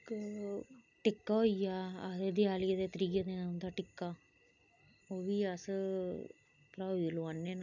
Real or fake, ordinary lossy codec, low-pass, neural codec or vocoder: real; none; 7.2 kHz; none